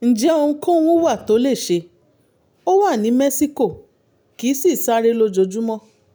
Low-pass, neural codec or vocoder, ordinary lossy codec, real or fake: none; none; none; real